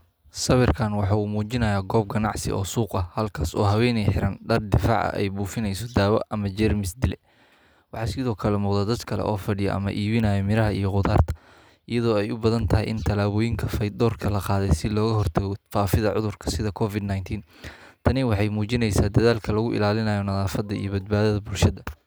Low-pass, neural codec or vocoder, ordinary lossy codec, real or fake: none; none; none; real